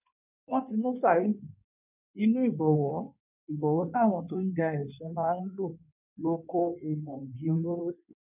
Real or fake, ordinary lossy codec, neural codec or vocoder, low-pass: fake; none; codec, 16 kHz in and 24 kHz out, 1.1 kbps, FireRedTTS-2 codec; 3.6 kHz